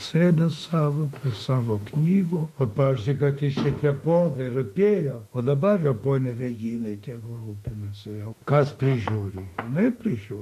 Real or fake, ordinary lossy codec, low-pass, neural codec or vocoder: fake; MP3, 64 kbps; 14.4 kHz; autoencoder, 48 kHz, 32 numbers a frame, DAC-VAE, trained on Japanese speech